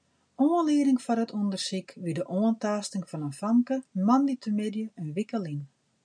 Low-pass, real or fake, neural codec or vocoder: 9.9 kHz; real; none